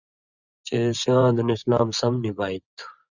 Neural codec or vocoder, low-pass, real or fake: vocoder, 24 kHz, 100 mel bands, Vocos; 7.2 kHz; fake